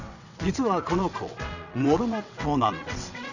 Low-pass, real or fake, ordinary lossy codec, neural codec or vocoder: 7.2 kHz; fake; none; vocoder, 22.05 kHz, 80 mel bands, WaveNeXt